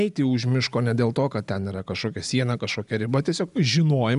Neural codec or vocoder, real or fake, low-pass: none; real; 10.8 kHz